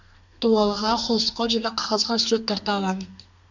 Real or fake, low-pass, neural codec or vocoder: fake; 7.2 kHz; codec, 32 kHz, 1.9 kbps, SNAC